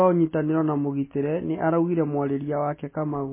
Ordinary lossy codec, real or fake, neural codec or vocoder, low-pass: MP3, 16 kbps; real; none; 3.6 kHz